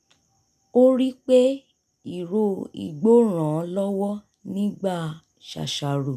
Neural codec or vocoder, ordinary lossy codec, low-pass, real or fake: none; none; 14.4 kHz; real